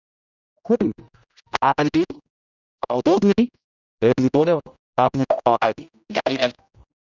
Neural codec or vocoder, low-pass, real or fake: codec, 16 kHz, 0.5 kbps, X-Codec, HuBERT features, trained on general audio; 7.2 kHz; fake